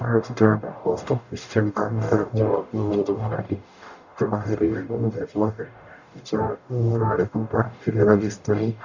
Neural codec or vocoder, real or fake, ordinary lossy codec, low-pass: codec, 44.1 kHz, 0.9 kbps, DAC; fake; none; 7.2 kHz